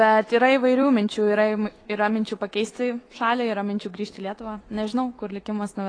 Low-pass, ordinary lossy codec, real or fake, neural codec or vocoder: 9.9 kHz; AAC, 32 kbps; fake; autoencoder, 48 kHz, 128 numbers a frame, DAC-VAE, trained on Japanese speech